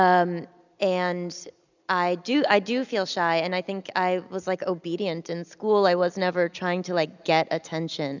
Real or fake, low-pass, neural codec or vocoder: real; 7.2 kHz; none